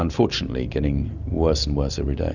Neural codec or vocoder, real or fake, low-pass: none; real; 7.2 kHz